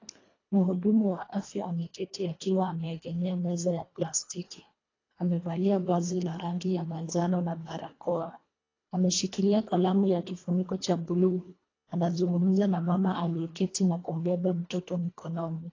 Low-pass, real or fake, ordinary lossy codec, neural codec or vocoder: 7.2 kHz; fake; AAC, 32 kbps; codec, 24 kHz, 1.5 kbps, HILCodec